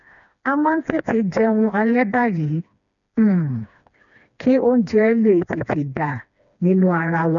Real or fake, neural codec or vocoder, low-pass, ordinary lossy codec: fake; codec, 16 kHz, 2 kbps, FreqCodec, smaller model; 7.2 kHz; none